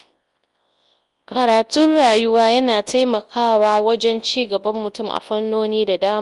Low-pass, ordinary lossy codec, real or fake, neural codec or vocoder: 10.8 kHz; Opus, 32 kbps; fake; codec, 24 kHz, 0.9 kbps, WavTokenizer, large speech release